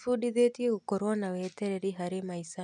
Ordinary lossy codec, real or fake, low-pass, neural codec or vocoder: none; real; 10.8 kHz; none